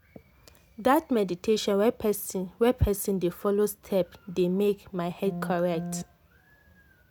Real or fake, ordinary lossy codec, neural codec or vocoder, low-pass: real; none; none; none